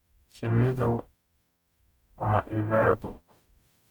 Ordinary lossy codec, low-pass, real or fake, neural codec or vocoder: none; 19.8 kHz; fake; codec, 44.1 kHz, 0.9 kbps, DAC